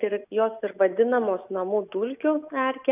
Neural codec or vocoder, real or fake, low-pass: none; real; 3.6 kHz